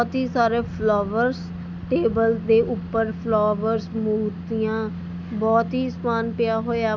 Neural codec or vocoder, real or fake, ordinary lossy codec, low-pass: none; real; none; 7.2 kHz